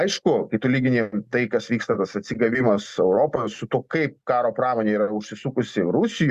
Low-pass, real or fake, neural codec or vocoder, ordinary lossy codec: 14.4 kHz; real; none; Opus, 64 kbps